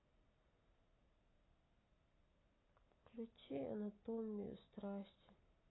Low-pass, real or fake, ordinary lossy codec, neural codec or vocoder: 7.2 kHz; real; AAC, 16 kbps; none